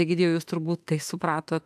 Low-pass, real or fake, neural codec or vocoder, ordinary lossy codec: 14.4 kHz; fake; autoencoder, 48 kHz, 32 numbers a frame, DAC-VAE, trained on Japanese speech; AAC, 96 kbps